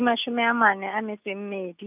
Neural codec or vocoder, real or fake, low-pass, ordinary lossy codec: none; real; 3.6 kHz; none